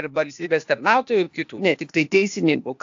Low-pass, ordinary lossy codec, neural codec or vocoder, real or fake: 7.2 kHz; AAC, 64 kbps; codec, 16 kHz, 0.8 kbps, ZipCodec; fake